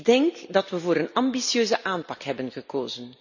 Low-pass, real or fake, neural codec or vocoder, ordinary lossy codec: 7.2 kHz; real; none; none